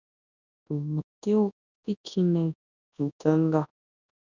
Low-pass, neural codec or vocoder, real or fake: 7.2 kHz; codec, 24 kHz, 0.9 kbps, WavTokenizer, large speech release; fake